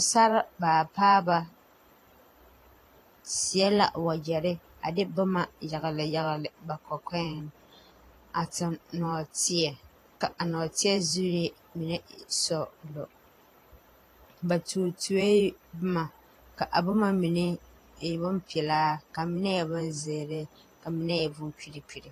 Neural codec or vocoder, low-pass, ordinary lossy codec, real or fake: vocoder, 44.1 kHz, 128 mel bands every 512 samples, BigVGAN v2; 14.4 kHz; AAC, 48 kbps; fake